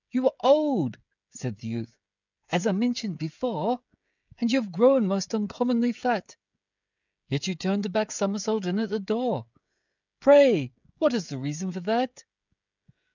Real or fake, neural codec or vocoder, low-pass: fake; codec, 16 kHz, 16 kbps, FreqCodec, smaller model; 7.2 kHz